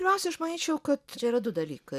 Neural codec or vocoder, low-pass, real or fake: none; 14.4 kHz; real